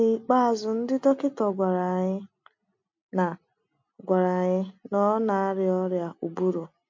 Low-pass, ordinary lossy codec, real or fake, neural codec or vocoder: 7.2 kHz; MP3, 48 kbps; real; none